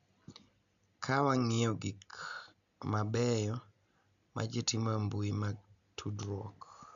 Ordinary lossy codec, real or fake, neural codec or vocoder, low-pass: none; real; none; 7.2 kHz